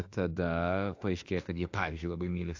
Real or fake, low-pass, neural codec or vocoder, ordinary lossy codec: fake; 7.2 kHz; autoencoder, 48 kHz, 32 numbers a frame, DAC-VAE, trained on Japanese speech; AAC, 48 kbps